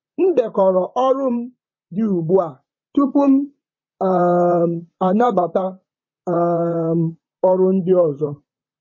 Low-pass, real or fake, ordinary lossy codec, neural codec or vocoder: 7.2 kHz; fake; MP3, 32 kbps; vocoder, 44.1 kHz, 128 mel bands, Pupu-Vocoder